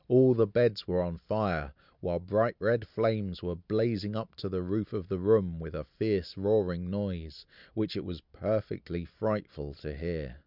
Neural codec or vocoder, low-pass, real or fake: none; 5.4 kHz; real